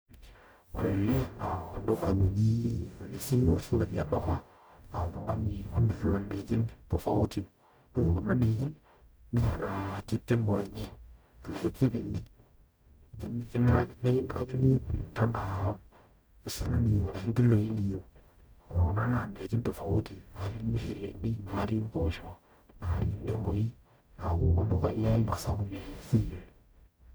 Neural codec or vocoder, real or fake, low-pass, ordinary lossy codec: codec, 44.1 kHz, 0.9 kbps, DAC; fake; none; none